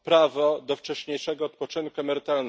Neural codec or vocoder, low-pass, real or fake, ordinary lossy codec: none; none; real; none